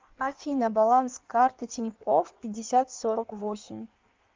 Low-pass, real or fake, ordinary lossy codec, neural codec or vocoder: 7.2 kHz; fake; Opus, 24 kbps; codec, 16 kHz in and 24 kHz out, 1.1 kbps, FireRedTTS-2 codec